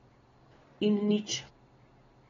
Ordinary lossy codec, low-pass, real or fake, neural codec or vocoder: AAC, 24 kbps; 7.2 kHz; real; none